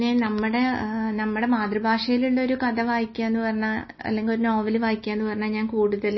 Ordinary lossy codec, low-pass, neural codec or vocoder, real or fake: MP3, 24 kbps; 7.2 kHz; none; real